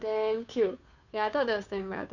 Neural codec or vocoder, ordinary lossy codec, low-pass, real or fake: codec, 16 kHz, 2 kbps, FunCodec, trained on Chinese and English, 25 frames a second; none; 7.2 kHz; fake